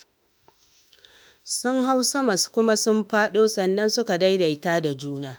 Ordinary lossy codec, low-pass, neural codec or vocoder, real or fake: none; none; autoencoder, 48 kHz, 32 numbers a frame, DAC-VAE, trained on Japanese speech; fake